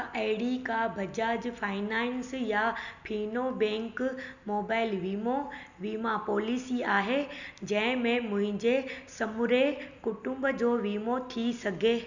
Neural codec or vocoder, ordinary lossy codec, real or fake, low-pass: none; none; real; 7.2 kHz